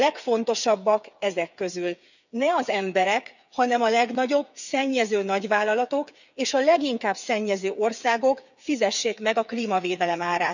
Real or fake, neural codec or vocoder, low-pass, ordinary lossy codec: fake; codec, 16 kHz, 8 kbps, FreqCodec, smaller model; 7.2 kHz; none